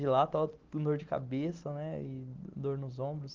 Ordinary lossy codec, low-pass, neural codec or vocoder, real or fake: Opus, 16 kbps; 7.2 kHz; none; real